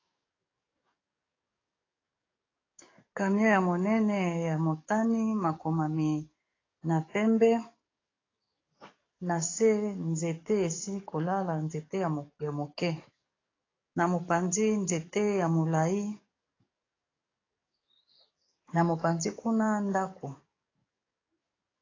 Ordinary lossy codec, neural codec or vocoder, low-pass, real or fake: AAC, 32 kbps; codec, 44.1 kHz, 7.8 kbps, DAC; 7.2 kHz; fake